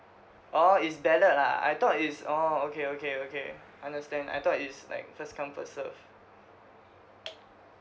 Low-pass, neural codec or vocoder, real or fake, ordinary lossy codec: none; none; real; none